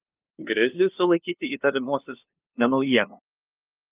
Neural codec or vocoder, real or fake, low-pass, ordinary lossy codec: codec, 16 kHz, 2 kbps, FunCodec, trained on LibriTTS, 25 frames a second; fake; 3.6 kHz; Opus, 24 kbps